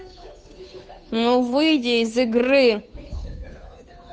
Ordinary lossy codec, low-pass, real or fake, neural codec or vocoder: none; none; fake; codec, 16 kHz, 2 kbps, FunCodec, trained on Chinese and English, 25 frames a second